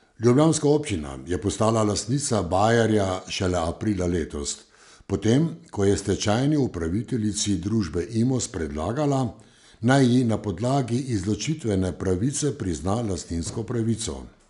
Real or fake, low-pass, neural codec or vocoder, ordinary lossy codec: real; 10.8 kHz; none; none